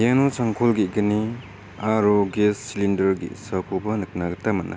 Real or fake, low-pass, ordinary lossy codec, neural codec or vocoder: real; none; none; none